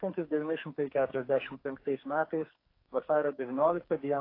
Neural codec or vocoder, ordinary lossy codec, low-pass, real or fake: codec, 44.1 kHz, 2.6 kbps, SNAC; AAC, 32 kbps; 5.4 kHz; fake